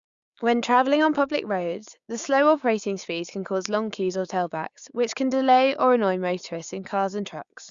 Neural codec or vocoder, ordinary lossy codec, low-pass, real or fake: codec, 16 kHz, 6 kbps, DAC; Opus, 64 kbps; 7.2 kHz; fake